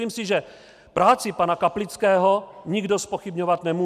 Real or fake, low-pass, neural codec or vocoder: real; 14.4 kHz; none